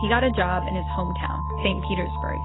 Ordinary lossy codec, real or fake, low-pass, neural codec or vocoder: AAC, 16 kbps; real; 7.2 kHz; none